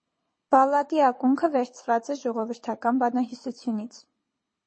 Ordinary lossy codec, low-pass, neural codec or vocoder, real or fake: MP3, 32 kbps; 9.9 kHz; codec, 24 kHz, 6 kbps, HILCodec; fake